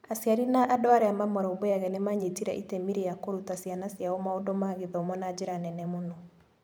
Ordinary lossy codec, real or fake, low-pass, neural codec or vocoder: none; fake; none; vocoder, 44.1 kHz, 128 mel bands every 512 samples, BigVGAN v2